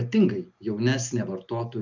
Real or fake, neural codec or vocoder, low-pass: real; none; 7.2 kHz